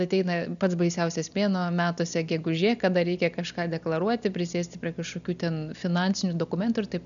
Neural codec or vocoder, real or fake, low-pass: none; real; 7.2 kHz